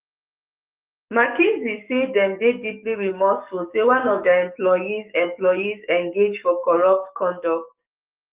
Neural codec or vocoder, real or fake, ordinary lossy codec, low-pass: codec, 44.1 kHz, 7.8 kbps, DAC; fake; Opus, 24 kbps; 3.6 kHz